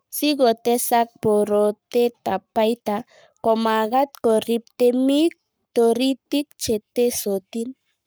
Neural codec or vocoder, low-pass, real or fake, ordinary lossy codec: codec, 44.1 kHz, 7.8 kbps, Pupu-Codec; none; fake; none